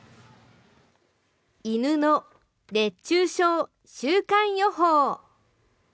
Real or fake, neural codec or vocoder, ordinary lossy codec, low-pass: real; none; none; none